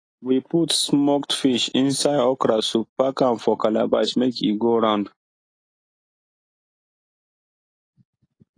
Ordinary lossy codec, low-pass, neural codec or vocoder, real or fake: AAC, 32 kbps; 9.9 kHz; none; real